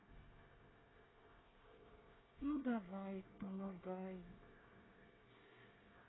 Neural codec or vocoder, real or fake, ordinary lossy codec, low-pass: codec, 24 kHz, 1 kbps, SNAC; fake; AAC, 16 kbps; 7.2 kHz